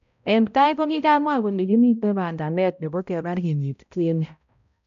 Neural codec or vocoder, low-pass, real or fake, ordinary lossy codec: codec, 16 kHz, 0.5 kbps, X-Codec, HuBERT features, trained on balanced general audio; 7.2 kHz; fake; none